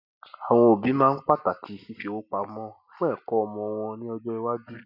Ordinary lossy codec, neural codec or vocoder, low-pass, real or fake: none; none; 5.4 kHz; real